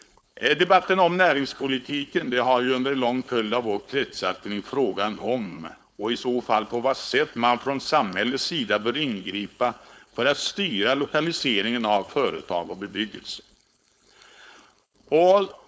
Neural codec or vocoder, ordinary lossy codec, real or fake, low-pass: codec, 16 kHz, 4.8 kbps, FACodec; none; fake; none